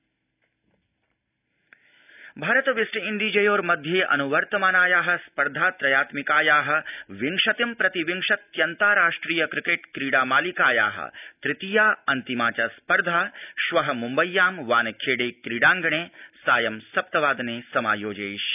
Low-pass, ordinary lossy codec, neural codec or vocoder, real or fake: 3.6 kHz; none; none; real